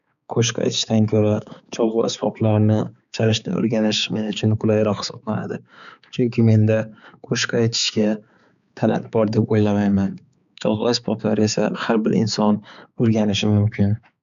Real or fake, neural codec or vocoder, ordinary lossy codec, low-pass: fake; codec, 16 kHz, 4 kbps, X-Codec, HuBERT features, trained on balanced general audio; none; 7.2 kHz